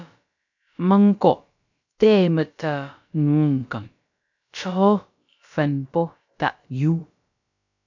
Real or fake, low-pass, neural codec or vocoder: fake; 7.2 kHz; codec, 16 kHz, about 1 kbps, DyCAST, with the encoder's durations